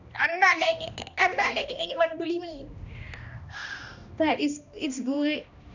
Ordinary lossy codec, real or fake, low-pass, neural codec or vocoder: none; fake; 7.2 kHz; codec, 16 kHz, 1 kbps, X-Codec, HuBERT features, trained on balanced general audio